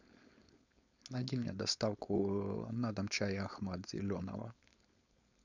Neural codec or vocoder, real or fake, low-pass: codec, 16 kHz, 4.8 kbps, FACodec; fake; 7.2 kHz